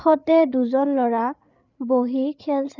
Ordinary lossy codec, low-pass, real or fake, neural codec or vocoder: none; 7.2 kHz; fake; codec, 16 kHz, 8 kbps, FreqCodec, larger model